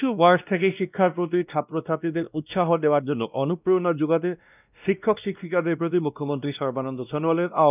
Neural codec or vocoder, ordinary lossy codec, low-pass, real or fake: codec, 16 kHz, 1 kbps, X-Codec, WavLM features, trained on Multilingual LibriSpeech; none; 3.6 kHz; fake